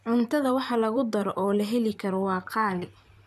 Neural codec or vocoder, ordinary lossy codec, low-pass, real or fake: vocoder, 44.1 kHz, 128 mel bands, Pupu-Vocoder; none; 14.4 kHz; fake